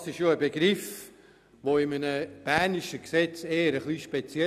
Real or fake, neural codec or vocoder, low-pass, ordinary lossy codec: real; none; 14.4 kHz; none